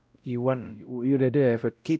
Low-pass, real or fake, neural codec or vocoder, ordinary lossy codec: none; fake; codec, 16 kHz, 0.5 kbps, X-Codec, WavLM features, trained on Multilingual LibriSpeech; none